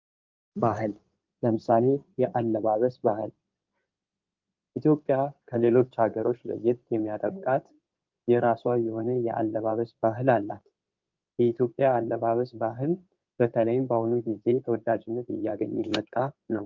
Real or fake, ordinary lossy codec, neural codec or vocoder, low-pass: fake; Opus, 24 kbps; codec, 16 kHz in and 24 kHz out, 2.2 kbps, FireRedTTS-2 codec; 7.2 kHz